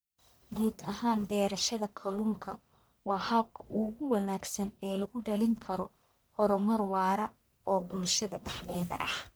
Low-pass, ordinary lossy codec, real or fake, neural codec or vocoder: none; none; fake; codec, 44.1 kHz, 1.7 kbps, Pupu-Codec